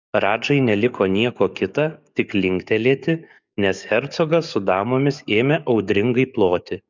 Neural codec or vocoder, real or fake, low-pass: codec, 44.1 kHz, 7.8 kbps, DAC; fake; 7.2 kHz